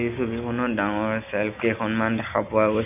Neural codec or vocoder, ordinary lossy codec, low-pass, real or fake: none; none; 3.6 kHz; real